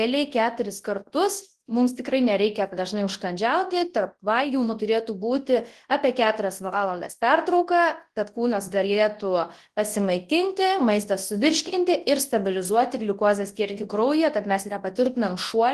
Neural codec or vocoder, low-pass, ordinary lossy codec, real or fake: codec, 24 kHz, 0.9 kbps, WavTokenizer, large speech release; 10.8 kHz; Opus, 16 kbps; fake